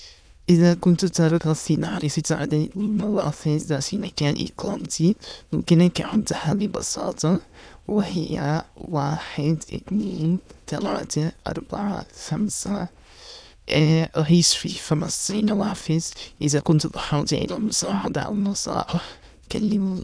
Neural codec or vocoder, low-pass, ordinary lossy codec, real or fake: autoencoder, 22.05 kHz, a latent of 192 numbers a frame, VITS, trained on many speakers; none; none; fake